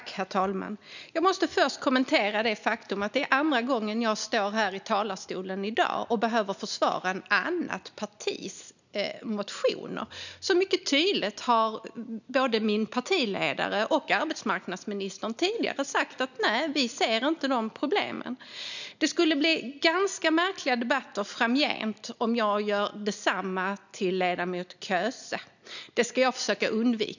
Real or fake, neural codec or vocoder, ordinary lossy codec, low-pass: real; none; none; 7.2 kHz